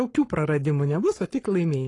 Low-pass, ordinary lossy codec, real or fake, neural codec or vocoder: 10.8 kHz; AAC, 32 kbps; fake; codec, 44.1 kHz, 7.8 kbps, Pupu-Codec